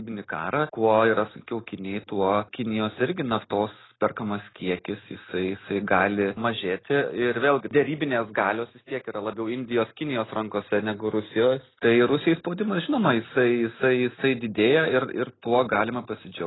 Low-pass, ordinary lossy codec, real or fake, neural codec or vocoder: 7.2 kHz; AAC, 16 kbps; real; none